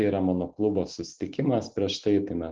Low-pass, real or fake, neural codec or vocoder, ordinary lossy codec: 7.2 kHz; real; none; Opus, 16 kbps